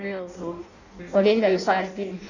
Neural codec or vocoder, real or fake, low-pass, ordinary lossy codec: codec, 16 kHz in and 24 kHz out, 0.6 kbps, FireRedTTS-2 codec; fake; 7.2 kHz; none